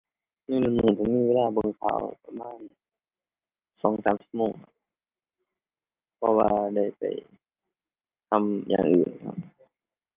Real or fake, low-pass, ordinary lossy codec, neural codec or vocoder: real; 3.6 kHz; Opus, 24 kbps; none